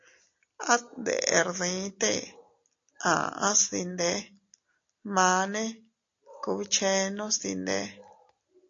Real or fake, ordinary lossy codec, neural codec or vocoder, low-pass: real; AAC, 48 kbps; none; 7.2 kHz